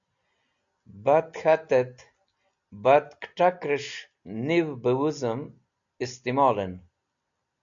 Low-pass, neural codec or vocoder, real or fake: 7.2 kHz; none; real